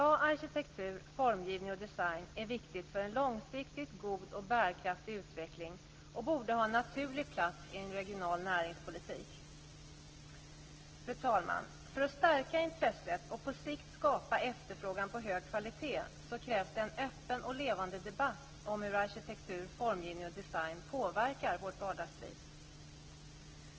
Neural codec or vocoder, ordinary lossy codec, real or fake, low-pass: none; Opus, 16 kbps; real; 7.2 kHz